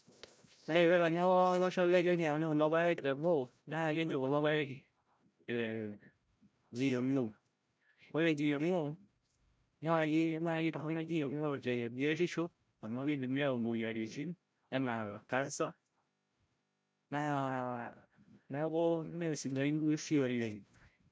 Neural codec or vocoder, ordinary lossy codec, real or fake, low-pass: codec, 16 kHz, 0.5 kbps, FreqCodec, larger model; none; fake; none